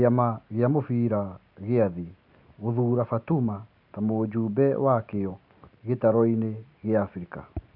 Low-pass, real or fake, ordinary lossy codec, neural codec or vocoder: 5.4 kHz; real; none; none